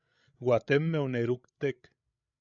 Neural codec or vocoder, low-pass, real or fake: codec, 16 kHz, 16 kbps, FreqCodec, larger model; 7.2 kHz; fake